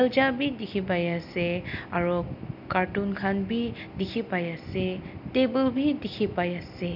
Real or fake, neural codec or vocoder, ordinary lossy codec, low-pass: real; none; AAC, 48 kbps; 5.4 kHz